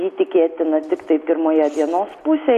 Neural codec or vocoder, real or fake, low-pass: none; real; 14.4 kHz